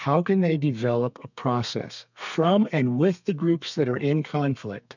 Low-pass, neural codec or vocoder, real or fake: 7.2 kHz; codec, 32 kHz, 1.9 kbps, SNAC; fake